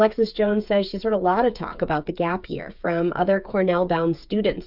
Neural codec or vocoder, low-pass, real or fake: codec, 16 kHz, 8 kbps, FreqCodec, smaller model; 5.4 kHz; fake